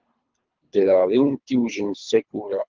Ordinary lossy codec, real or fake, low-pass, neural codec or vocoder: Opus, 32 kbps; fake; 7.2 kHz; codec, 24 kHz, 3 kbps, HILCodec